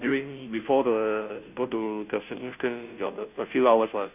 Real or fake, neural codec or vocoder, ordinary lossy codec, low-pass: fake; codec, 16 kHz, 0.5 kbps, FunCodec, trained on Chinese and English, 25 frames a second; none; 3.6 kHz